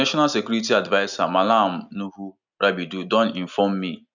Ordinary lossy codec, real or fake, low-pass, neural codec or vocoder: none; real; 7.2 kHz; none